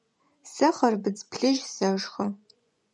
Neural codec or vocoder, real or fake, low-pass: vocoder, 24 kHz, 100 mel bands, Vocos; fake; 10.8 kHz